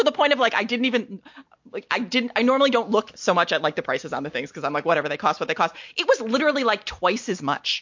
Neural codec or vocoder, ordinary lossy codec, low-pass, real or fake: none; MP3, 48 kbps; 7.2 kHz; real